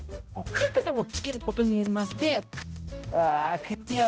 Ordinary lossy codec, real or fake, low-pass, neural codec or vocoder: none; fake; none; codec, 16 kHz, 0.5 kbps, X-Codec, HuBERT features, trained on balanced general audio